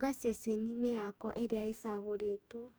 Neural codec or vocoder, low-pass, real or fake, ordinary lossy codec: codec, 44.1 kHz, 2.6 kbps, DAC; none; fake; none